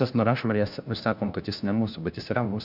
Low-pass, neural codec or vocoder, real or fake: 5.4 kHz; codec, 16 kHz, 1 kbps, FunCodec, trained on LibriTTS, 50 frames a second; fake